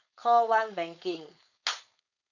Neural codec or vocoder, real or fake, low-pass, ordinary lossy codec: codec, 16 kHz, 4.8 kbps, FACodec; fake; 7.2 kHz; none